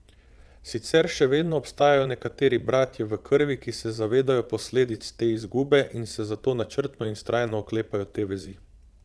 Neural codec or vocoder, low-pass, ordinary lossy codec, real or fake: vocoder, 22.05 kHz, 80 mel bands, Vocos; none; none; fake